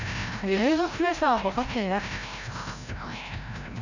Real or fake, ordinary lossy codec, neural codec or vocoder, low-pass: fake; none; codec, 16 kHz, 0.5 kbps, FreqCodec, larger model; 7.2 kHz